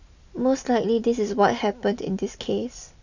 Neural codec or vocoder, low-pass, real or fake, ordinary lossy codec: none; 7.2 kHz; real; none